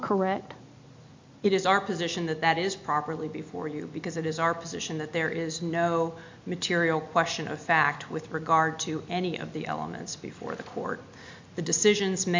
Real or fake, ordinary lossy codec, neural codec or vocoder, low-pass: real; MP3, 48 kbps; none; 7.2 kHz